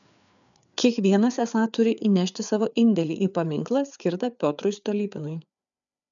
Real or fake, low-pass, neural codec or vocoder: fake; 7.2 kHz; codec, 16 kHz, 4 kbps, FreqCodec, larger model